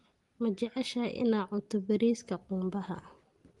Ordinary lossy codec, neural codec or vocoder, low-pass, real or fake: Opus, 24 kbps; none; 10.8 kHz; real